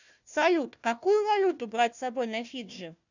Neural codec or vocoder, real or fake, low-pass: codec, 16 kHz, 1 kbps, FunCodec, trained on Chinese and English, 50 frames a second; fake; 7.2 kHz